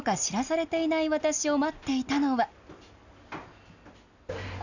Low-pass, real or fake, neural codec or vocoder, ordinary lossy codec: 7.2 kHz; real; none; none